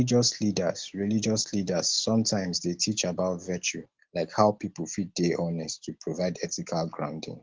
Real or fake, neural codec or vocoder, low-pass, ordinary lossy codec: real; none; 7.2 kHz; Opus, 16 kbps